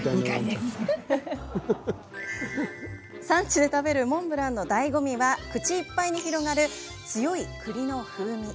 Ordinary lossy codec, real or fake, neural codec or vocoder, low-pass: none; real; none; none